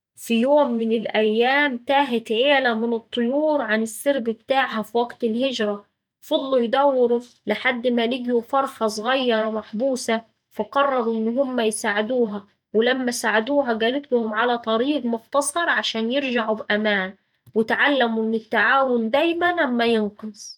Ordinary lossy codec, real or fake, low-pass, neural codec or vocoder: none; fake; 19.8 kHz; vocoder, 44.1 kHz, 128 mel bands every 512 samples, BigVGAN v2